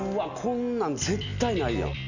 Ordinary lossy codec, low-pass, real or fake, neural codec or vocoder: none; 7.2 kHz; real; none